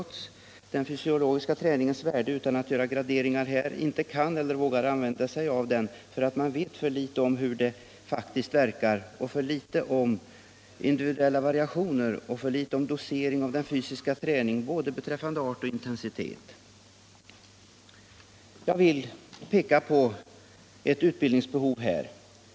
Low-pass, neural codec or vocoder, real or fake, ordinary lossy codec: none; none; real; none